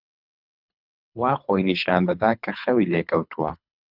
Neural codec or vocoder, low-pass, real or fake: codec, 24 kHz, 3 kbps, HILCodec; 5.4 kHz; fake